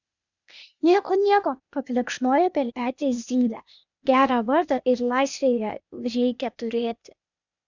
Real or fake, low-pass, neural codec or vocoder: fake; 7.2 kHz; codec, 16 kHz, 0.8 kbps, ZipCodec